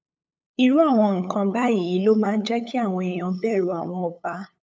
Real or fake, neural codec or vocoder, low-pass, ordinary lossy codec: fake; codec, 16 kHz, 8 kbps, FunCodec, trained on LibriTTS, 25 frames a second; none; none